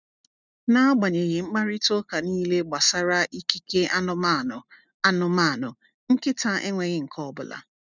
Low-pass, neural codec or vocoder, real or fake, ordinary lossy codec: 7.2 kHz; none; real; none